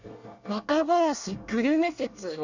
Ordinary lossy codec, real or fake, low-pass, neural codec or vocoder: none; fake; 7.2 kHz; codec, 24 kHz, 1 kbps, SNAC